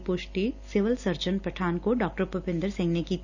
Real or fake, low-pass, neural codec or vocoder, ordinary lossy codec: real; 7.2 kHz; none; none